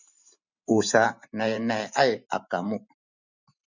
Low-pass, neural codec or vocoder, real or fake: 7.2 kHz; vocoder, 44.1 kHz, 128 mel bands every 512 samples, BigVGAN v2; fake